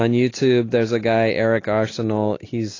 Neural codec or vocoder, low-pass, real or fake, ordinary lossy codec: none; 7.2 kHz; real; AAC, 32 kbps